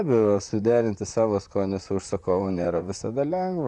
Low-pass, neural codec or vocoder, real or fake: 10.8 kHz; vocoder, 44.1 kHz, 128 mel bands, Pupu-Vocoder; fake